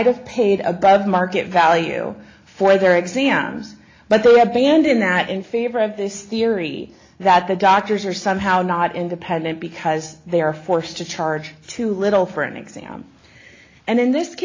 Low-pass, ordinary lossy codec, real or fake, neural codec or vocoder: 7.2 kHz; AAC, 32 kbps; real; none